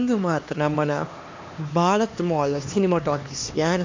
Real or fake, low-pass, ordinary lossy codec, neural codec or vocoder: fake; 7.2 kHz; MP3, 48 kbps; codec, 16 kHz, 2 kbps, X-Codec, HuBERT features, trained on LibriSpeech